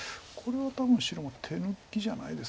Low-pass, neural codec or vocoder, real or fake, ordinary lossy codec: none; none; real; none